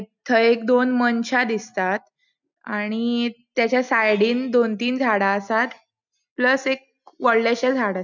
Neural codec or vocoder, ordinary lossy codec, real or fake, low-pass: none; none; real; 7.2 kHz